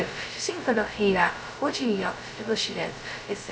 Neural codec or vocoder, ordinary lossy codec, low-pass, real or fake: codec, 16 kHz, 0.2 kbps, FocalCodec; none; none; fake